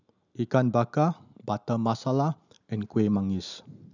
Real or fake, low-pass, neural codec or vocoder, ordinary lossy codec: real; 7.2 kHz; none; none